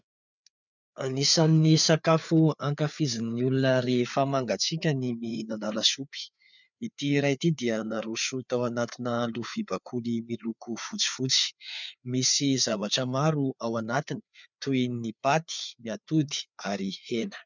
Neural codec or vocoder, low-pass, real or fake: codec, 16 kHz, 4 kbps, FreqCodec, larger model; 7.2 kHz; fake